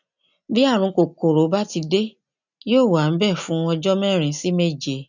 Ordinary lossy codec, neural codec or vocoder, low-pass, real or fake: none; none; 7.2 kHz; real